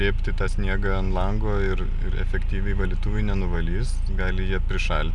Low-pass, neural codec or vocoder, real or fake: 10.8 kHz; none; real